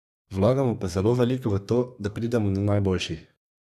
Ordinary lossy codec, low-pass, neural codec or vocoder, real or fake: none; 14.4 kHz; codec, 32 kHz, 1.9 kbps, SNAC; fake